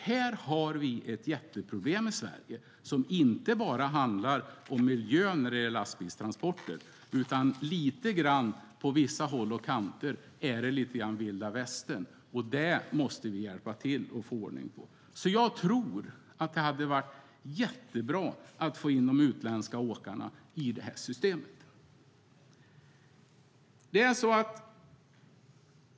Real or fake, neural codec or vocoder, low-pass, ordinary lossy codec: real; none; none; none